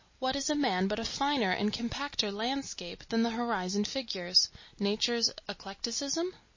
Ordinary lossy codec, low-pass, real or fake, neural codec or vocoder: MP3, 32 kbps; 7.2 kHz; real; none